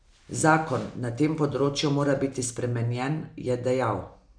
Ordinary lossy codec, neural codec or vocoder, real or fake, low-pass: none; none; real; 9.9 kHz